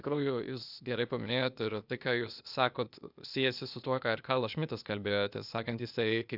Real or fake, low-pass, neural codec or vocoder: fake; 5.4 kHz; codec, 16 kHz, 0.8 kbps, ZipCodec